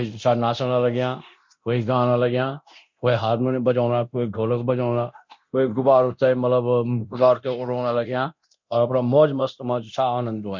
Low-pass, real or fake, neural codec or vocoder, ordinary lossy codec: 7.2 kHz; fake; codec, 24 kHz, 0.9 kbps, DualCodec; MP3, 48 kbps